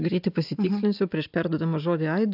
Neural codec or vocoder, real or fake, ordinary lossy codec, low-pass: none; real; AAC, 48 kbps; 5.4 kHz